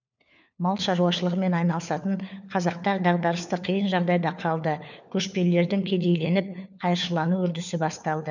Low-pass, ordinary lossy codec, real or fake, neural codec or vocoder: 7.2 kHz; none; fake; codec, 16 kHz, 4 kbps, FunCodec, trained on LibriTTS, 50 frames a second